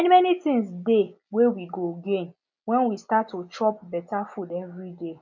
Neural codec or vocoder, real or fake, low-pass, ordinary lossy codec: none; real; 7.2 kHz; none